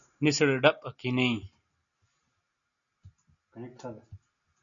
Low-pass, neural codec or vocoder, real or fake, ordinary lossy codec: 7.2 kHz; none; real; MP3, 96 kbps